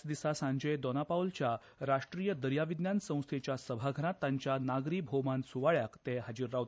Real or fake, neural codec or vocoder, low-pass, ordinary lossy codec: real; none; none; none